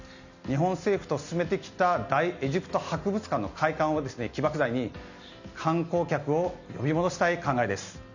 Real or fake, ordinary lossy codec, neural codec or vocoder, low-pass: real; none; none; 7.2 kHz